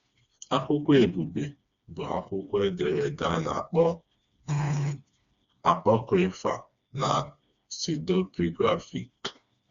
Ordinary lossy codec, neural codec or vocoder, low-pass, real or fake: Opus, 64 kbps; codec, 16 kHz, 2 kbps, FreqCodec, smaller model; 7.2 kHz; fake